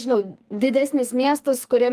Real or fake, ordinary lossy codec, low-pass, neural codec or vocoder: fake; Opus, 32 kbps; 14.4 kHz; codec, 44.1 kHz, 2.6 kbps, SNAC